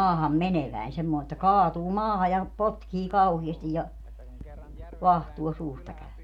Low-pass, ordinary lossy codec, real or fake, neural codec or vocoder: 19.8 kHz; none; real; none